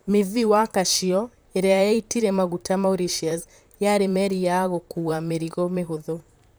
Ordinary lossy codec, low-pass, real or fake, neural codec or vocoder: none; none; fake; vocoder, 44.1 kHz, 128 mel bands, Pupu-Vocoder